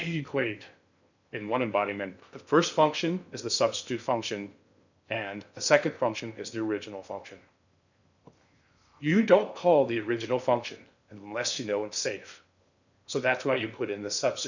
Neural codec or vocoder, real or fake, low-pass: codec, 16 kHz in and 24 kHz out, 0.6 kbps, FocalCodec, streaming, 2048 codes; fake; 7.2 kHz